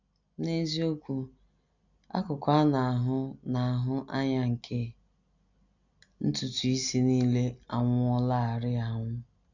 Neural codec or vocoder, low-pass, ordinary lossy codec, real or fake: none; 7.2 kHz; none; real